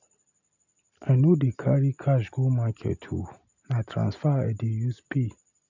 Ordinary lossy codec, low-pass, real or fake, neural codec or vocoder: none; 7.2 kHz; real; none